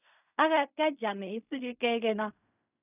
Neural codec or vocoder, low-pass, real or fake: codec, 16 kHz in and 24 kHz out, 0.4 kbps, LongCat-Audio-Codec, fine tuned four codebook decoder; 3.6 kHz; fake